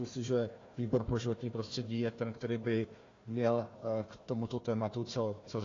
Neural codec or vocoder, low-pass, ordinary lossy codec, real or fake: codec, 16 kHz, 1 kbps, FunCodec, trained on Chinese and English, 50 frames a second; 7.2 kHz; AAC, 32 kbps; fake